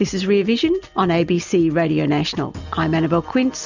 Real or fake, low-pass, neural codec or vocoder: real; 7.2 kHz; none